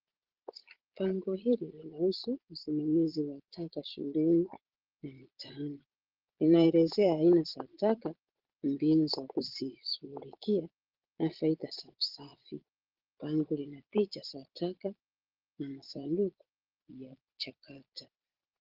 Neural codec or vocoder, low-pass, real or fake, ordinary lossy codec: vocoder, 22.05 kHz, 80 mel bands, Vocos; 5.4 kHz; fake; Opus, 16 kbps